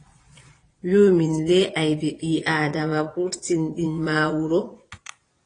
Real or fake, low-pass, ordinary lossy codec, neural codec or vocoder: fake; 9.9 kHz; AAC, 32 kbps; vocoder, 22.05 kHz, 80 mel bands, Vocos